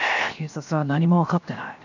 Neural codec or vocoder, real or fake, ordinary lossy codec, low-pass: codec, 16 kHz, 0.7 kbps, FocalCodec; fake; none; 7.2 kHz